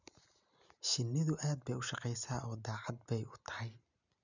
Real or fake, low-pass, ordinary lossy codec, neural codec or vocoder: real; 7.2 kHz; none; none